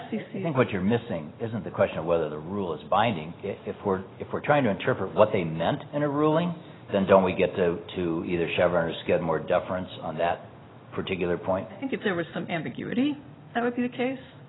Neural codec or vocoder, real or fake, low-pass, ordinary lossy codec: none; real; 7.2 kHz; AAC, 16 kbps